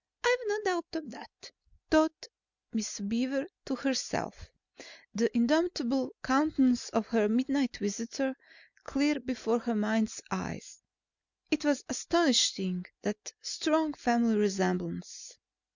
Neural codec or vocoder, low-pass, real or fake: none; 7.2 kHz; real